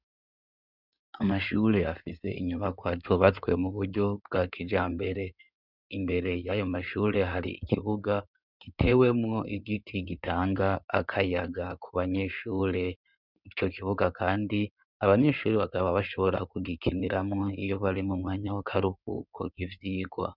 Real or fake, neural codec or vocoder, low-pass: fake; codec, 16 kHz in and 24 kHz out, 2.2 kbps, FireRedTTS-2 codec; 5.4 kHz